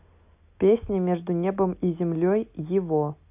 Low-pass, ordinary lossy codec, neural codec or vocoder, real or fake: 3.6 kHz; AAC, 32 kbps; none; real